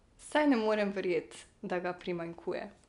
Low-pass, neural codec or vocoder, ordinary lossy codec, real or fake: 10.8 kHz; none; none; real